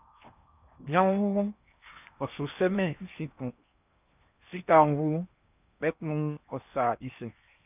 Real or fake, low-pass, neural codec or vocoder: fake; 3.6 kHz; codec, 16 kHz in and 24 kHz out, 0.8 kbps, FocalCodec, streaming, 65536 codes